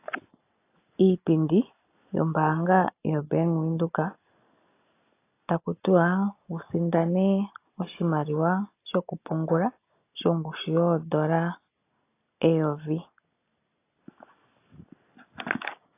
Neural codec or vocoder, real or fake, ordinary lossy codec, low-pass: none; real; AAC, 24 kbps; 3.6 kHz